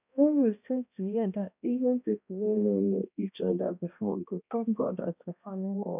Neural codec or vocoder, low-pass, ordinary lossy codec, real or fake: codec, 16 kHz, 1 kbps, X-Codec, HuBERT features, trained on balanced general audio; 3.6 kHz; none; fake